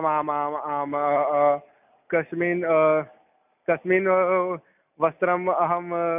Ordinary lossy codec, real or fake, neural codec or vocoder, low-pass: none; real; none; 3.6 kHz